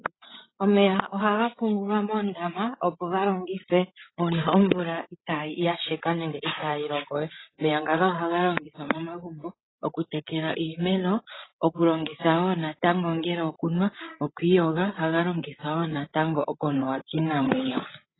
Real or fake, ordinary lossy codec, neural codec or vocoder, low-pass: fake; AAC, 16 kbps; codec, 16 kHz, 16 kbps, FreqCodec, larger model; 7.2 kHz